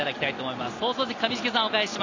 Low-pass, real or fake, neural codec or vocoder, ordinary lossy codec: 7.2 kHz; real; none; MP3, 64 kbps